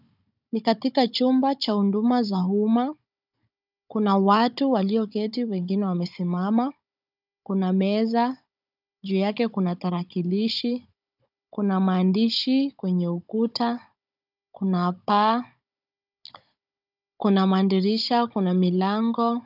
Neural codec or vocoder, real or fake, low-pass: codec, 16 kHz, 16 kbps, FunCodec, trained on Chinese and English, 50 frames a second; fake; 5.4 kHz